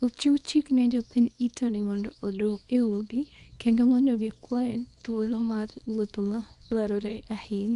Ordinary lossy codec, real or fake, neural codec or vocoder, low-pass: none; fake; codec, 24 kHz, 0.9 kbps, WavTokenizer, small release; 10.8 kHz